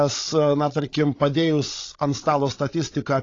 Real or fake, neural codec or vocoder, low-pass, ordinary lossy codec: fake; codec, 16 kHz, 16 kbps, FreqCodec, larger model; 7.2 kHz; AAC, 32 kbps